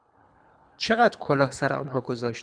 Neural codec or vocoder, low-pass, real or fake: codec, 24 kHz, 3 kbps, HILCodec; 9.9 kHz; fake